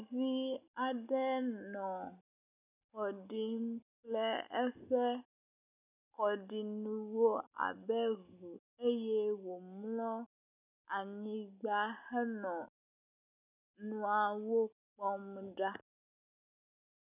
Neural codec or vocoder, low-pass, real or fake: none; 3.6 kHz; real